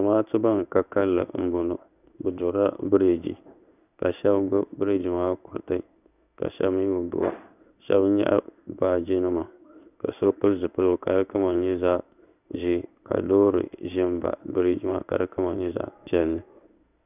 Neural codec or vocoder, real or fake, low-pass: codec, 16 kHz in and 24 kHz out, 1 kbps, XY-Tokenizer; fake; 3.6 kHz